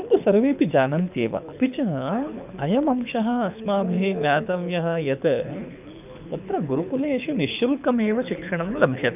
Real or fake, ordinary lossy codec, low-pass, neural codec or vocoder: fake; none; 3.6 kHz; codec, 24 kHz, 6 kbps, HILCodec